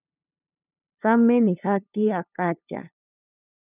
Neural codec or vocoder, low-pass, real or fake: codec, 16 kHz, 2 kbps, FunCodec, trained on LibriTTS, 25 frames a second; 3.6 kHz; fake